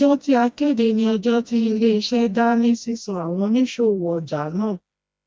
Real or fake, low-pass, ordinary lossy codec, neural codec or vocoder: fake; none; none; codec, 16 kHz, 1 kbps, FreqCodec, smaller model